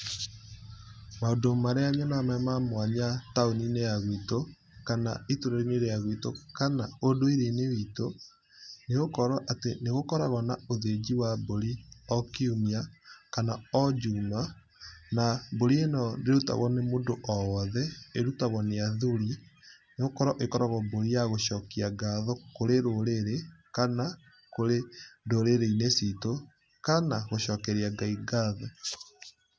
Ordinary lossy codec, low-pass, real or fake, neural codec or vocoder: none; none; real; none